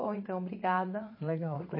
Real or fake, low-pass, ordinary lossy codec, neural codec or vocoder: fake; 5.4 kHz; MP3, 24 kbps; vocoder, 44.1 kHz, 80 mel bands, Vocos